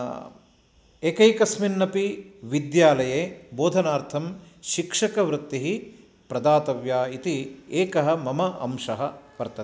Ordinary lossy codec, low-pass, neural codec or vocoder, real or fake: none; none; none; real